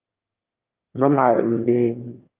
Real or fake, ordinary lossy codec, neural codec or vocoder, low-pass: fake; Opus, 24 kbps; autoencoder, 22.05 kHz, a latent of 192 numbers a frame, VITS, trained on one speaker; 3.6 kHz